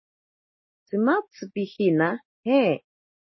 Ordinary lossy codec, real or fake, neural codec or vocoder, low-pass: MP3, 24 kbps; real; none; 7.2 kHz